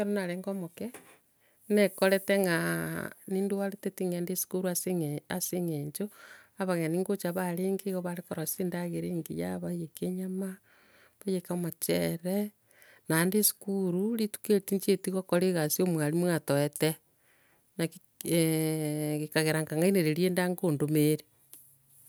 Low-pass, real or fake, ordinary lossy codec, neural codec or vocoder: none; real; none; none